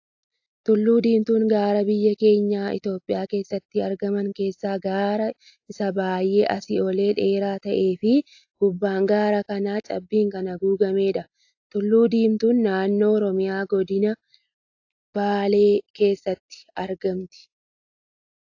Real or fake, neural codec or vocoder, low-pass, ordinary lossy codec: real; none; 7.2 kHz; AAC, 48 kbps